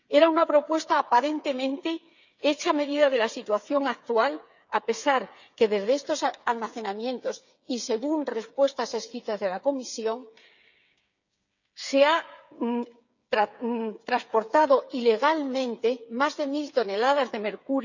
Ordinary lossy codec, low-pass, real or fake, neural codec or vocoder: none; 7.2 kHz; fake; codec, 16 kHz, 8 kbps, FreqCodec, smaller model